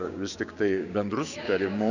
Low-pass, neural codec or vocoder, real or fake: 7.2 kHz; codec, 16 kHz, 6 kbps, DAC; fake